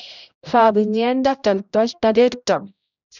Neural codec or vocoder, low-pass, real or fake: codec, 16 kHz, 0.5 kbps, X-Codec, HuBERT features, trained on general audio; 7.2 kHz; fake